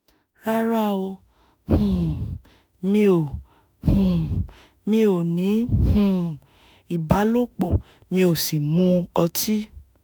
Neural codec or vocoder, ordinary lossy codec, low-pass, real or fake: autoencoder, 48 kHz, 32 numbers a frame, DAC-VAE, trained on Japanese speech; none; none; fake